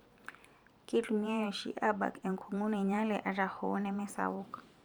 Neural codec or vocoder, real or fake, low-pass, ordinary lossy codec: vocoder, 44.1 kHz, 128 mel bands every 512 samples, BigVGAN v2; fake; 19.8 kHz; none